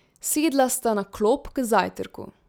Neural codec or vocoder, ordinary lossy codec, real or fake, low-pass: none; none; real; none